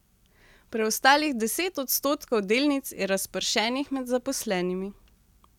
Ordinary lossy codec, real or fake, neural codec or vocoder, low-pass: none; real; none; 19.8 kHz